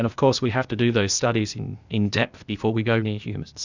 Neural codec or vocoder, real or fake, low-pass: codec, 16 kHz, 0.8 kbps, ZipCodec; fake; 7.2 kHz